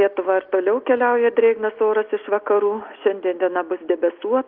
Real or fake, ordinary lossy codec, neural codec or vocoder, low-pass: real; Opus, 24 kbps; none; 5.4 kHz